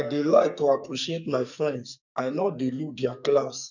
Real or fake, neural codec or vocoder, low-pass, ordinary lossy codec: fake; codec, 44.1 kHz, 2.6 kbps, SNAC; 7.2 kHz; none